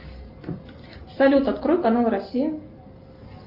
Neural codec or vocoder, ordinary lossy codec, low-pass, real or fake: none; Opus, 24 kbps; 5.4 kHz; real